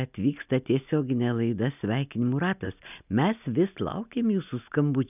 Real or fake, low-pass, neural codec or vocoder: real; 3.6 kHz; none